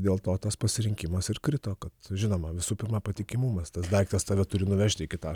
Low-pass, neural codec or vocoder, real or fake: 19.8 kHz; none; real